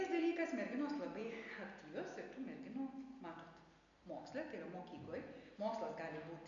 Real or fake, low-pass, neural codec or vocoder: real; 7.2 kHz; none